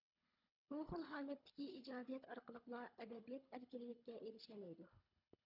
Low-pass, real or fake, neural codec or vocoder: 5.4 kHz; fake; codec, 24 kHz, 3 kbps, HILCodec